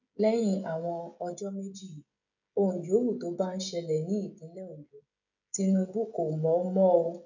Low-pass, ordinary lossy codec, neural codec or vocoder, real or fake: 7.2 kHz; none; codec, 16 kHz, 16 kbps, FreqCodec, smaller model; fake